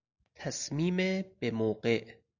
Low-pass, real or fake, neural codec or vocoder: 7.2 kHz; real; none